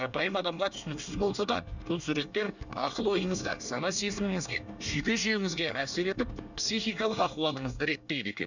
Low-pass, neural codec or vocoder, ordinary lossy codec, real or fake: 7.2 kHz; codec, 24 kHz, 1 kbps, SNAC; none; fake